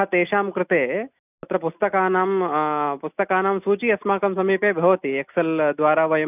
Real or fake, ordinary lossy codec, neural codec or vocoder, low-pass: real; none; none; 3.6 kHz